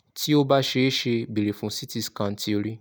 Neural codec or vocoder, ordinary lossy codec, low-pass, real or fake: none; none; none; real